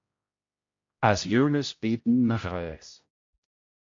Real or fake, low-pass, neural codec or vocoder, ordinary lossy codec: fake; 7.2 kHz; codec, 16 kHz, 0.5 kbps, X-Codec, HuBERT features, trained on general audio; MP3, 48 kbps